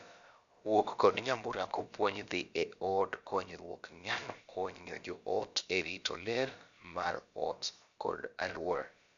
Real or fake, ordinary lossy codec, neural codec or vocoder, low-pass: fake; none; codec, 16 kHz, about 1 kbps, DyCAST, with the encoder's durations; 7.2 kHz